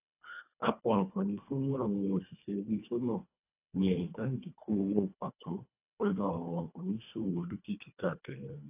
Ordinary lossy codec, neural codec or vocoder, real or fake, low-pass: AAC, 32 kbps; codec, 24 kHz, 1.5 kbps, HILCodec; fake; 3.6 kHz